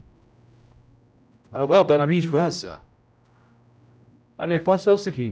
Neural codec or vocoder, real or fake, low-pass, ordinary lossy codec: codec, 16 kHz, 0.5 kbps, X-Codec, HuBERT features, trained on general audio; fake; none; none